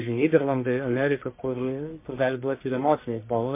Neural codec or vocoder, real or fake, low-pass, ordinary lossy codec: codec, 44.1 kHz, 1.7 kbps, Pupu-Codec; fake; 3.6 kHz; MP3, 24 kbps